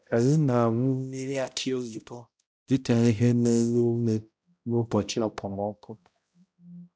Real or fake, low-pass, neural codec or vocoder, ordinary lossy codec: fake; none; codec, 16 kHz, 0.5 kbps, X-Codec, HuBERT features, trained on balanced general audio; none